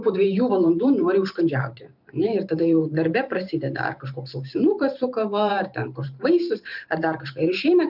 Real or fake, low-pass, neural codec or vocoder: fake; 5.4 kHz; vocoder, 44.1 kHz, 128 mel bands every 512 samples, BigVGAN v2